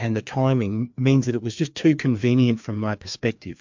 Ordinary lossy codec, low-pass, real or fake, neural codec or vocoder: MP3, 64 kbps; 7.2 kHz; fake; codec, 16 kHz, 2 kbps, FreqCodec, larger model